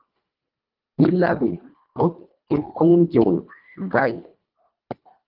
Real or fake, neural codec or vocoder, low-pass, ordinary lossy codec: fake; codec, 24 kHz, 1.5 kbps, HILCodec; 5.4 kHz; Opus, 32 kbps